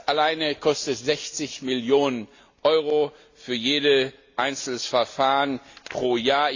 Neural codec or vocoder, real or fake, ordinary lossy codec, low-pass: none; real; AAC, 48 kbps; 7.2 kHz